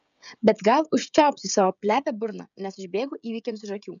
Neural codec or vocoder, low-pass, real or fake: codec, 16 kHz, 16 kbps, FreqCodec, smaller model; 7.2 kHz; fake